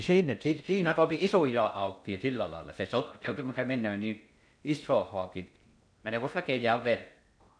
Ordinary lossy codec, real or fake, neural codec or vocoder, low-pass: none; fake; codec, 16 kHz in and 24 kHz out, 0.6 kbps, FocalCodec, streaming, 2048 codes; 9.9 kHz